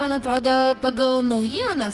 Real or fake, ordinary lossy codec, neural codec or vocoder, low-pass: fake; Opus, 64 kbps; codec, 24 kHz, 0.9 kbps, WavTokenizer, medium music audio release; 10.8 kHz